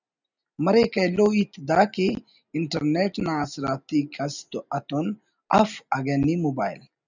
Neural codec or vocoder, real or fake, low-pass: none; real; 7.2 kHz